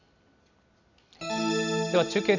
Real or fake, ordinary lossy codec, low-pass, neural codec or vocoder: real; none; 7.2 kHz; none